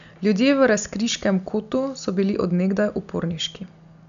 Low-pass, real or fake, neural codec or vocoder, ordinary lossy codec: 7.2 kHz; real; none; none